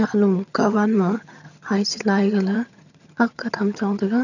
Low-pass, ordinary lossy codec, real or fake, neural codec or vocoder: 7.2 kHz; none; fake; vocoder, 22.05 kHz, 80 mel bands, HiFi-GAN